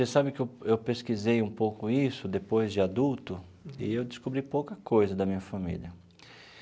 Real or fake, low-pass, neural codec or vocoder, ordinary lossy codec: real; none; none; none